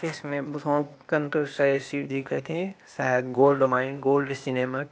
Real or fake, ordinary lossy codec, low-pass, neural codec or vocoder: fake; none; none; codec, 16 kHz, 0.8 kbps, ZipCodec